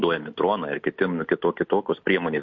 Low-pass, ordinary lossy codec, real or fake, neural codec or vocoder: 7.2 kHz; MP3, 48 kbps; real; none